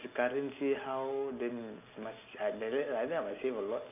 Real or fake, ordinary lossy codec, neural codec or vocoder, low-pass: real; none; none; 3.6 kHz